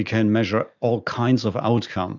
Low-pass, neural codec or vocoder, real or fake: 7.2 kHz; none; real